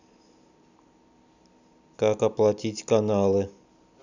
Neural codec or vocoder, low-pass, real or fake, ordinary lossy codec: none; 7.2 kHz; real; none